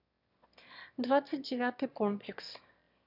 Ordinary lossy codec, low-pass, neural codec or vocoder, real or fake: none; 5.4 kHz; autoencoder, 22.05 kHz, a latent of 192 numbers a frame, VITS, trained on one speaker; fake